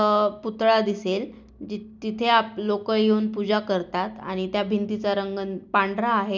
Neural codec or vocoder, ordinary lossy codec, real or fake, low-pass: none; none; real; none